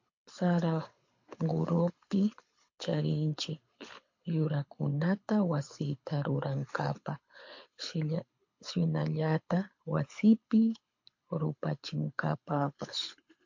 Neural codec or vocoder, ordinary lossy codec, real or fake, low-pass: codec, 24 kHz, 6 kbps, HILCodec; MP3, 48 kbps; fake; 7.2 kHz